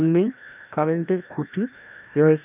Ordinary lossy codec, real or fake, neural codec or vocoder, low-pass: none; fake; codec, 16 kHz, 1 kbps, FreqCodec, larger model; 3.6 kHz